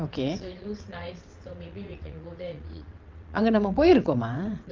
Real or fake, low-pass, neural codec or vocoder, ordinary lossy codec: fake; 7.2 kHz; vocoder, 22.05 kHz, 80 mel bands, WaveNeXt; Opus, 32 kbps